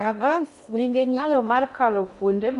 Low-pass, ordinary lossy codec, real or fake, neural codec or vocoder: 10.8 kHz; none; fake; codec, 16 kHz in and 24 kHz out, 0.6 kbps, FocalCodec, streaming, 2048 codes